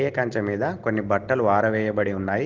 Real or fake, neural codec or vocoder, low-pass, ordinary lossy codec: real; none; 7.2 kHz; Opus, 16 kbps